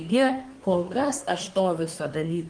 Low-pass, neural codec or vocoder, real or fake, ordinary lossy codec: 9.9 kHz; codec, 24 kHz, 1 kbps, SNAC; fake; Opus, 24 kbps